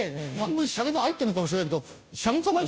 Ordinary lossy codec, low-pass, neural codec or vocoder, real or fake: none; none; codec, 16 kHz, 0.5 kbps, FunCodec, trained on Chinese and English, 25 frames a second; fake